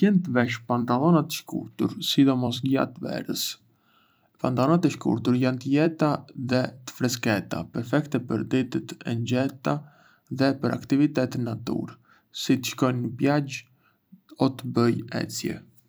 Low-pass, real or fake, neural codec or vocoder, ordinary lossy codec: none; fake; vocoder, 44.1 kHz, 128 mel bands every 256 samples, BigVGAN v2; none